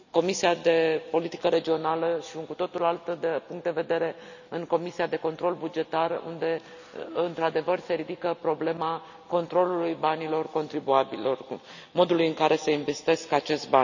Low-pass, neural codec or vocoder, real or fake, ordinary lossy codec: 7.2 kHz; none; real; none